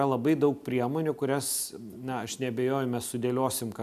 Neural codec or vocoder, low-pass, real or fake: none; 14.4 kHz; real